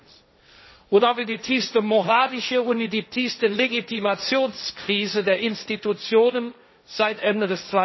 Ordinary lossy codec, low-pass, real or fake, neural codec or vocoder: MP3, 24 kbps; 7.2 kHz; fake; codec, 16 kHz, 1.1 kbps, Voila-Tokenizer